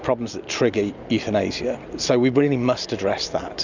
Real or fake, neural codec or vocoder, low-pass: real; none; 7.2 kHz